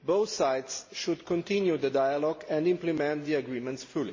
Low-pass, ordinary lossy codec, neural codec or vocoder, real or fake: 7.2 kHz; MP3, 32 kbps; none; real